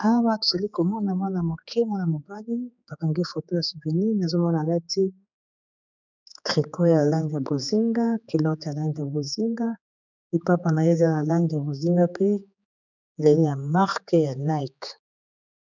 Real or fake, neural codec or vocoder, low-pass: fake; codec, 16 kHz, 4 kbps, X-Codec, HuBERT features, trained on general audio; 7.2 kHz